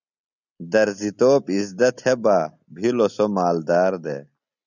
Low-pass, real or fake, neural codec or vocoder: 7.2 kHz; real; none